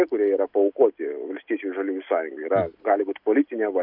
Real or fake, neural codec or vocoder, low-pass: real; none; 5.4 kHz